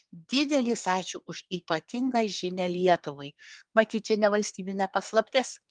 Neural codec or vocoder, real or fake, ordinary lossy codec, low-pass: codec, 24 kHz, 1 kbps, SNAC; fake; Opus, 32 kbps; 9.9 kHz